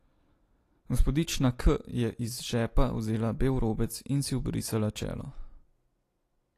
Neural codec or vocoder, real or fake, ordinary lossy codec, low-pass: none; real; AAC, 48 kbps; 14.4 kHz